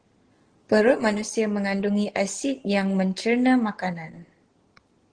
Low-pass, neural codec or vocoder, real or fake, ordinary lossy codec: 9.9 kHz; none; real; Opus, 16 kbps